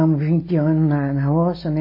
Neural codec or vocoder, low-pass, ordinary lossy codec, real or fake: none; 5.4 kHz; MP3, 24 kbps; real